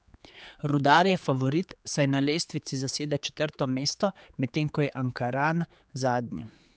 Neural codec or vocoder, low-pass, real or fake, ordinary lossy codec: codec, 16 kHz, 4 kbps, X-Codec, HuBERT features, trained on general audio; none; fake; none